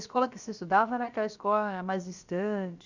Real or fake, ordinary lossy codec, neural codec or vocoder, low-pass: fake; Opus, 64 kbps; codec, 16 kHz, 0.7 kbps, FocalCodec; 7.2 kHz